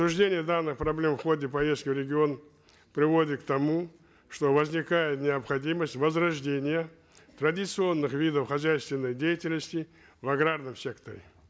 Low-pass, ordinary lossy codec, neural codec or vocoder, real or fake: none; none; none; real